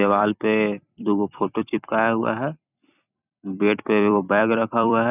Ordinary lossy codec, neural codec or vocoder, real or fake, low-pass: none; vocoder, 44.1 kHz, 128 mel bands every 256 samples, BigVGAN v2; fake; 3.6 kHz